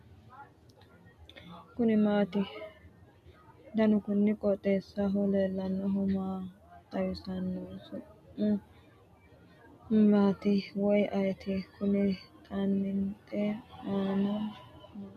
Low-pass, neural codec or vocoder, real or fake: 14.4 kHz; none; real